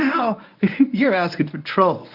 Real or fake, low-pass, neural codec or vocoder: fake; 5.4 kHz; codec, 24 kHz, 0.9 kbps, WavTokenizer, medium speech release version 1